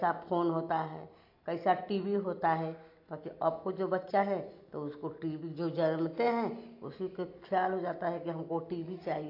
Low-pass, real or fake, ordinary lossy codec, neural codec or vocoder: 5.4 kHz; real; AAC, 32 kbps; none